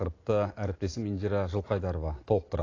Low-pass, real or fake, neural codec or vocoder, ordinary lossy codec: 7.2 kHz; real; none; AAC, 32 kbps